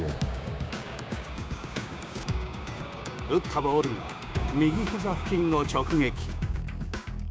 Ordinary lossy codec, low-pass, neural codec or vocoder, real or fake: none; none; codec, 16 kHz, 6 kbps, DAC; fake